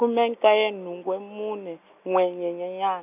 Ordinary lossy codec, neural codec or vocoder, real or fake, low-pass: none; none; real; 3.6 kHz